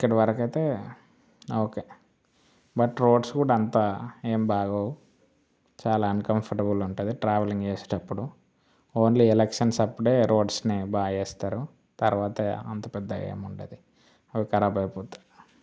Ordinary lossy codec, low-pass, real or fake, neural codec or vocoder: none; none; real; none